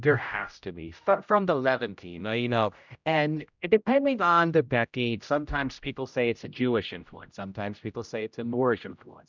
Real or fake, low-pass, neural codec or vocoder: fake; 7.2 kHz; codec, 16 kHz, 0.5 kbps, X-Codec, HuBERT features, trained on general audio